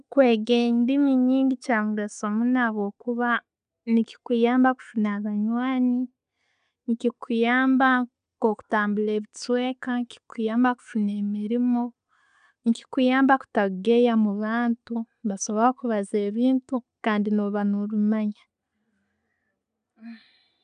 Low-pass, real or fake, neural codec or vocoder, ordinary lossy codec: 9.9 kHz; real; none; none